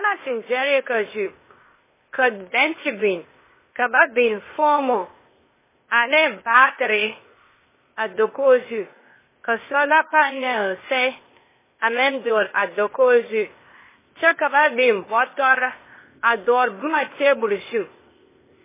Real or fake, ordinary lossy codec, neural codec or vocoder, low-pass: fake; MP3, 16 kbps; codec, 16 kHz, 0.8 kbps, ZipCodec; 3.6 kHz